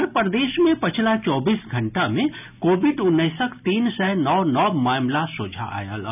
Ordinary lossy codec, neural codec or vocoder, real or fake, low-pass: none; none; real; 3.6 kHz